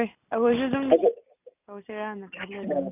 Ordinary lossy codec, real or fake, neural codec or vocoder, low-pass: none; real; none; 3.6 kHz